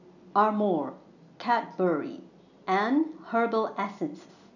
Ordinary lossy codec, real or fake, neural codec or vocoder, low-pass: none; real; none; 7.2 kHz